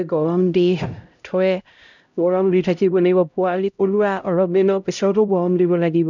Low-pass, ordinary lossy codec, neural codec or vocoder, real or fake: 7.2 kHz; none; codec, 16 kHz, 0.5 kbps, X-Codec, HuBERT features, trained on LibriSpeech; fake